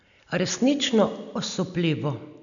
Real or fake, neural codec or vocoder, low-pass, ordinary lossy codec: real; none; 7.2 kHz; none